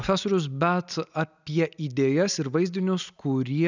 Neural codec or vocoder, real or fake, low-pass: none; real; 7.2 kHz